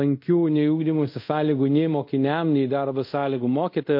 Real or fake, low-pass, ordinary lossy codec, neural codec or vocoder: fake; 5.4 kHz; MP3, 32 kbps; codec, 24 kHz, 0.5 kbps, DualCodec